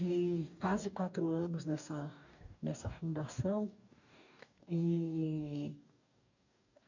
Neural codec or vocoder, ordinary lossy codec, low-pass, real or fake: codec, 44.1 kHz, 2.6 kbps, DAC; none; 7.2 kHz; fake